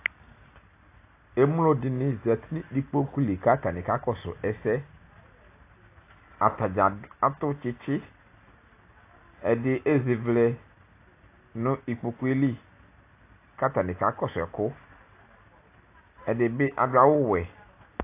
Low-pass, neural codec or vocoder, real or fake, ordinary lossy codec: 3.6 kHz; none; real; AAC, 24 kbps